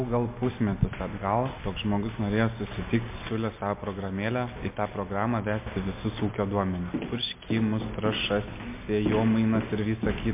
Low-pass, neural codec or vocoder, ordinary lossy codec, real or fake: 3.6 kHz; none; MP3, 24 kbps; real